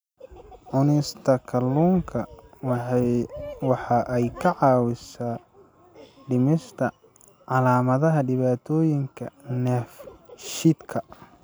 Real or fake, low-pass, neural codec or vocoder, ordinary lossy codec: real; none; none; none